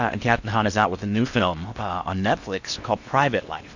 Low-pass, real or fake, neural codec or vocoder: 7.2 kHz; fake; codec, 16 kHz in and 24 kHz out, 0.8 kbps, FocalCodec, streaming, 65536 codes